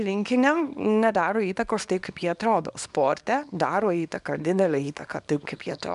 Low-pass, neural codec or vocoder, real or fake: 10.8 kHz; codec, 24 kHz, 0.9 kbps, WavTokenizer, small release; fake